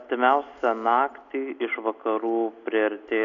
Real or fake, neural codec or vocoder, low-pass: real; none; 7.2 kHz